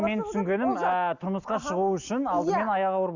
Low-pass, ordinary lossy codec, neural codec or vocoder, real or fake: 7.2 kHz; Opus, 64 kbps; none; real